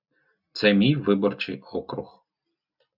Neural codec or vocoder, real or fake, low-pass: none; real; 5.4 kHz